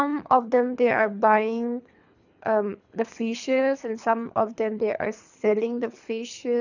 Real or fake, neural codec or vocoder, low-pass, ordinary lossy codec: fake; codec, 24 kHz, 3 kbps, HILCodec; 7.2 kHz; none